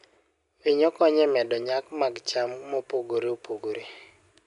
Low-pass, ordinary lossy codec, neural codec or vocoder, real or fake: 10.8 kHz; none; none; real